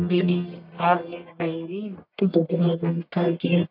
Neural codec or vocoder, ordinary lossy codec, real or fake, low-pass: codec, 44.1 kHz, 1.7 kbps, Pupu-Codec; none; fake; 5.4 kHz